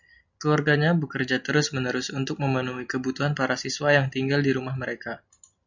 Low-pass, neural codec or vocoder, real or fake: 7.2 kHz; none; real